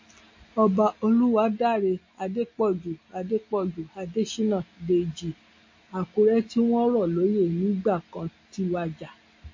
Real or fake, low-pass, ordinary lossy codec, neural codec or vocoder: real; 7.2 kHz; MP3, 32 kbps; none